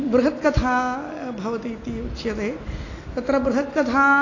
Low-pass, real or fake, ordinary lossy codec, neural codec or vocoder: 7.2 kHz; real; AAC, 32 kbps; none